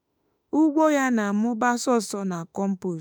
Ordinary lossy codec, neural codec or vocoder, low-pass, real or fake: none; autoencoder, 48 kHz, 32 numbers a frame, DAC-VAE, trained on Japanese speech; none; fake